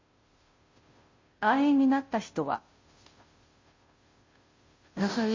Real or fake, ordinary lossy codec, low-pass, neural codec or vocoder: fake; MP3, 32 kbps; 7.2 kHz; codec, 16 kHz, 0.5 kbps, FunCodec, trained on Chinese and English, 25 frames a second